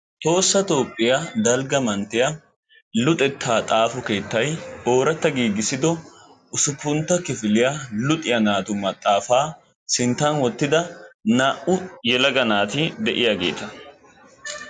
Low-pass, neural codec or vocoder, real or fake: 9.9 kHz; none; real